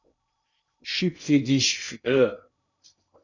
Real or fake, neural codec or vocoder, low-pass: fake; codec, 16 kHz in and 24 kHz out, 0.6 kbps, FocalCodec, streaming, 2048 codes; 7.2 kHz